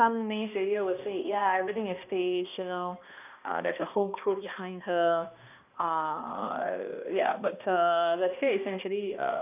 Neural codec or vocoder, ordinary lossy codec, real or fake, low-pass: codec, 16 kHz, 1 kbps, X-Codec, HuBERT features, trained on balanced general audio; none; fake; 3.6 kHz